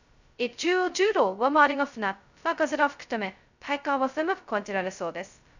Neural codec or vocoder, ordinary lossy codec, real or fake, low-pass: codec, 16 kHz, 0.2 kbps, FocalCodec; none; fake; 7.2 kHz